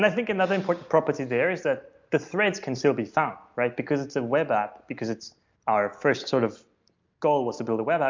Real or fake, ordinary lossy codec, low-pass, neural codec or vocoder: real; MP3, 64 kbps; 7.2 kHz; none